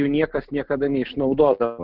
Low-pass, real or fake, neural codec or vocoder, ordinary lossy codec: 5.4 kHz; real; none; Opus, 16 kbps